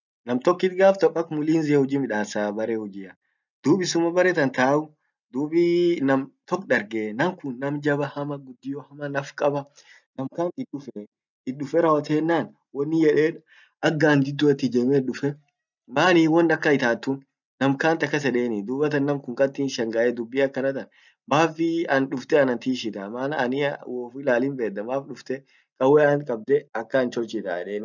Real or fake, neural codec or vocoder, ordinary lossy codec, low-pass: real; none; none; 7.2 kHz